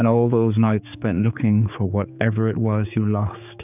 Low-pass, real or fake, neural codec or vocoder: 3.6 kHz; fake; codec, 16 kHz, 4 kbps, X-Codec, HuBERT features, trained on general audio